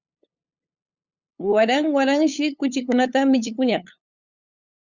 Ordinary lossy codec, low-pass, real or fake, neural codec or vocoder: Opus, 64 kbps; 7.2 kHz; fake; codec, 16 kHz, 8 kbps, FunCodec, trained on LibriTTS, 25 frames a second